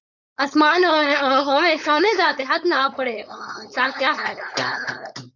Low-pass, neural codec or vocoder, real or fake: 7.2 kHz; codec, 16 kHz, 4.8 kbps, FACodec; fake